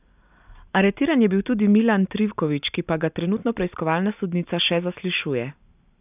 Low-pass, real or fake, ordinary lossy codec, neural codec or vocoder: 3.6 kHz; real; none; none